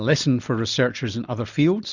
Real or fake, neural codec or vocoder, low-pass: real; none; 7.2 kHz